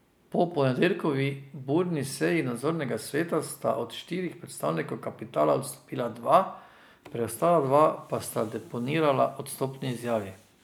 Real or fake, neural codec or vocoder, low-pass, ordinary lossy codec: real; none; none; none